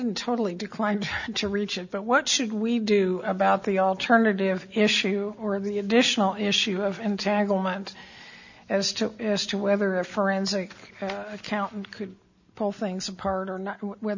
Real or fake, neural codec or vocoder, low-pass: real; none; 7.2 kHz